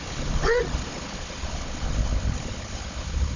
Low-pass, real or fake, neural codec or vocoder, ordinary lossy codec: 7.2 kHz; fake; codec, 16 kHz, 16 kbps, FunCodec, trained on Chinese and English, 50 frames a second; none